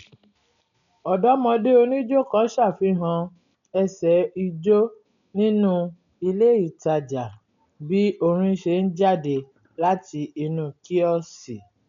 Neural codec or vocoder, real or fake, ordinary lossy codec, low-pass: none; real; none; 7.2 kHz